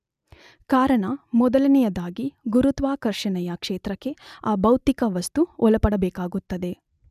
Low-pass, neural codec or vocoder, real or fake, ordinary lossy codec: 14.4 kHz; none; real; none